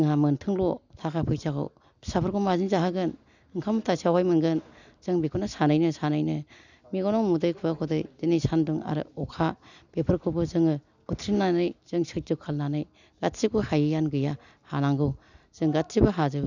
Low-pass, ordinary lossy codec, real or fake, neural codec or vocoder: 7.2 kHz; none; real; none